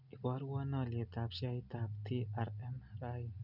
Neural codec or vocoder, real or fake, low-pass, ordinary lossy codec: none; real; 5.4 kHz; none